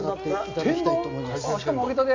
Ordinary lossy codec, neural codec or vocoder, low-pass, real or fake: MP3, 48 kbps; none; 7.2 kHz; real